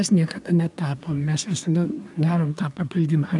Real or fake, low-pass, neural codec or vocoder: fake; 10.8 kHz; codec, 24 kHz, 1 kbps, SNAC